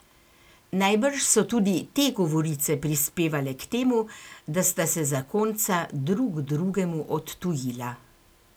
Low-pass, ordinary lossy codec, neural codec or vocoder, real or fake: none; none; none; real